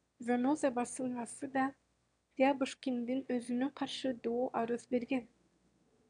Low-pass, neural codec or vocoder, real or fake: 9.9 kHz; autoencoder, 22.05 kHz, a latent of 192 numbers a frame, VITS, trained on one speaker; fake